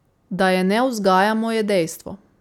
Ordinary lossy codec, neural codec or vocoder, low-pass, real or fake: none; none; 19.8 kHz; real